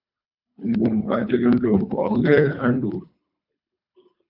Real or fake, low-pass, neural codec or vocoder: fake; 5.4 kHz; codec, 24 kHz, 3 kbps, HILCodec